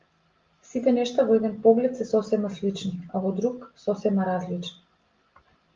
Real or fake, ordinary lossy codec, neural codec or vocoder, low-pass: real; Opus, 32 kbps; none; 7.2 kHz